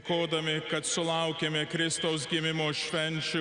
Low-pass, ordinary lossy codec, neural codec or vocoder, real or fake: 9.9 kHz; Opus, 64 kbps; none; real